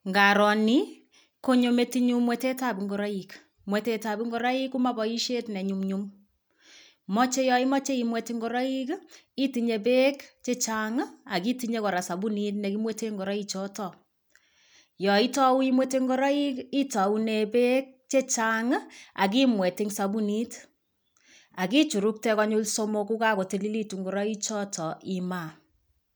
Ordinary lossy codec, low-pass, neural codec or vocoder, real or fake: none; none; none; real